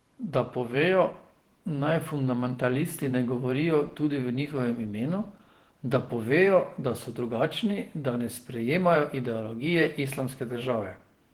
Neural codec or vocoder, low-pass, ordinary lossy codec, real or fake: vocoder, 48 kHz, 128 mel bands, Vocos; 19.8 kHz; Opus, 16 kbps; fake